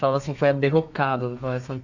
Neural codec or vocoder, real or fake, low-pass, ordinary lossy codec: codec, 32 kHz, 1.9 kbps, SNAC; fake; 7.2 kHz; none